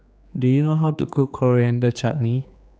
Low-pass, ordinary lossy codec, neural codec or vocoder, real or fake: none; none; codec, 16 kHz, 2 kbps, X-Codec, HuBERT features, trained on general audio; fake